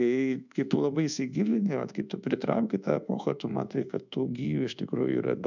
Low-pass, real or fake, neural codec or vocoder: 7.2 kHz; fake; codec, 24 kHz, 1.2 kbps, DualCodec